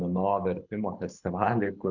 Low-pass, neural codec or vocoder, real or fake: 7.2 kHz; codec, 24 kHz, 6 kbps, HILCodec; fake